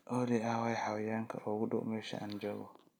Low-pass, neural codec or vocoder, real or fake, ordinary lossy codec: none; none; real; none